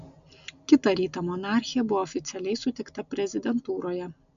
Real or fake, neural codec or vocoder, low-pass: real; none; 7.2 kHz